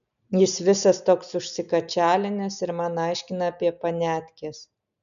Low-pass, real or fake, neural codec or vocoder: 7.2 kHz; real; none